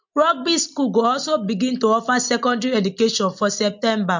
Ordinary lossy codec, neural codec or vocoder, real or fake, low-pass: MP3, 48 kbps; none; real; 7.2 kHz